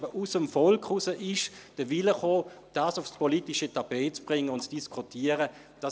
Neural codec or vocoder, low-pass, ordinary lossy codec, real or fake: none; none; none; real